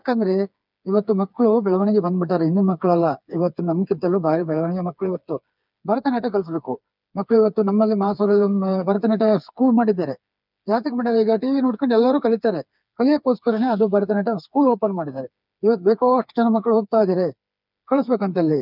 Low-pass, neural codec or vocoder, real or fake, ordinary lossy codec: 5.4 kHz; codec, 16 kHz, 4 kbps, FreqCodec, smaller model; fake; none